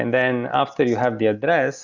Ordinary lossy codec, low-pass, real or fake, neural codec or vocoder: Opus, 64 kbps; 7.2 kHz; real; none